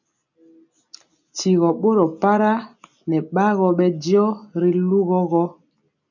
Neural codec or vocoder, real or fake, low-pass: none; real; 7.2 kHz